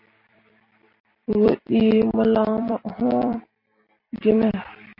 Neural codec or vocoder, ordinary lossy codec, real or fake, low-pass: vocoder, 44.1 kHz, 128 mel bands every 256 samples, BigVGAN v2; MP3, 32 kbps; fake; 5.4 kHz